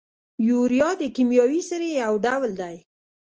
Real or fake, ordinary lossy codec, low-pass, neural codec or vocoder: real; Opus, 24 kbps; 7.2 kHz; none